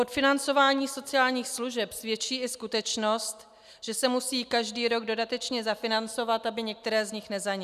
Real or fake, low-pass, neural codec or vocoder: real; 14.4 kHz; none